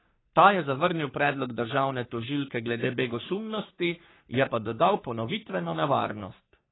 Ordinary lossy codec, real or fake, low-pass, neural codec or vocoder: AAC, 16 kbps; fake; 7.2 kHz; codec, 44.1 kHz, 3.4 kbps, Pupu-Codec